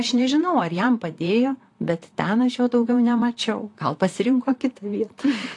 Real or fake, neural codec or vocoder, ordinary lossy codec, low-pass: fake; vocoder, 44.1 kHz, 128 mel bands, Pupu-Vocoder; AAC, 48 kbps; 10.8 kHz